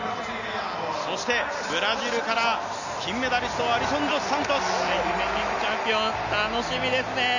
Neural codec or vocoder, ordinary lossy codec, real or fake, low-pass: none; none; real; 7.2 kHz